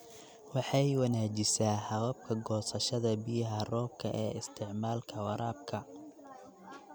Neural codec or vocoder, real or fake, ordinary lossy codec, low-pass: none; real; none; none